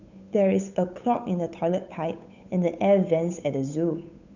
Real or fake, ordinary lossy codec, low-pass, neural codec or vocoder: fake; none; 7.2 kHz; codec, 16 kHz, 8 kbps, FunCodec, trained on Chinese and English, 25 frames a second